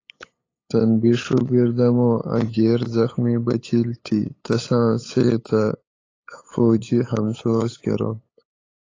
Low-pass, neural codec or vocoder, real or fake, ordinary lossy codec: 7.2 kHz; codec, 16 kHz, 8 kbps, FunCodec, trained on LibriTTS, 25 frames a second; fake; AAC, 32 kbps